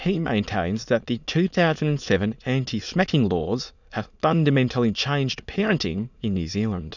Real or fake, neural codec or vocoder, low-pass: fake; autoencoder, 22.05 kHz, a latent of 192 numbers a frame, VITS, trained on many speakers; 7.2 kHz